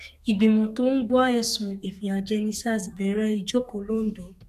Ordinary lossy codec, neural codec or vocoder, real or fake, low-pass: none; codec, 32 kHz, 1.9 kbps, SNAC; fake; 14.4 kHz